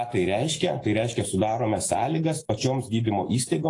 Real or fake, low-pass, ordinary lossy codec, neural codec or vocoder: fake; 10.8 kHz; AAC, 32 kbps; autoencoder, 48 kHz, 128 numbers a frame, DAC-VAE, trained on Japanese speech